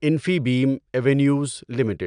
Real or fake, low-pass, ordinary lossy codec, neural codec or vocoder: real; 9.9 kHz; none; none